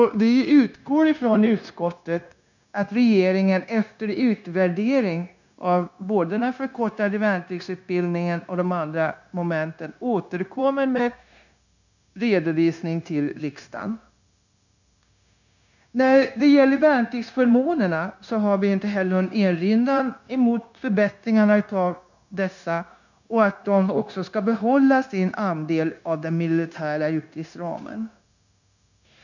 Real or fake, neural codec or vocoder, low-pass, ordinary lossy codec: fake; codec, 16 kHz, 0.9 kbps, LongCat-Audio-Codec; 7.2 kHz; none